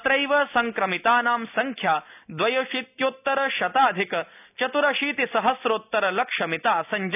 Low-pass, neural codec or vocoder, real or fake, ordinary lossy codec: 3.6 kHz; none; real; none